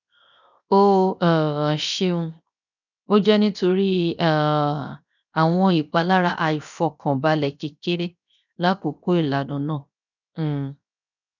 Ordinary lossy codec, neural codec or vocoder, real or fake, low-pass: none; codec, 16 kHz, 0.7 kbps, FocalCodec; fake; 7.2 kHz